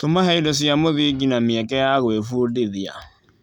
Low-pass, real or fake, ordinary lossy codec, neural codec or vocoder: 19.8 kHz; real; none; none